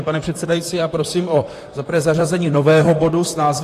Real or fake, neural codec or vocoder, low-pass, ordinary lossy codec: fake; vocoder, 44.1 kHz, 128 mel bands, Pupu-Vocoder; 14.4 kHz; AAC, 48 kbps